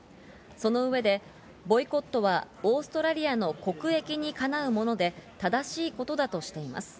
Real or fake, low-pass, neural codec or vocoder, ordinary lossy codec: real; none; none; none